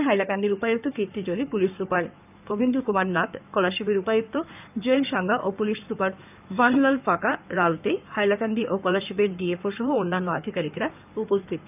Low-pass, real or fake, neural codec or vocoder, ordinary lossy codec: 3.6 kHz; fake; codec, 16 kHz in and 24 kHz out, 2.2 kbps, FireRedTTS-2 codec; none